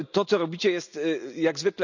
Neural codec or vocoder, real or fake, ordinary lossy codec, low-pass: none; real; none; 7.2 kHz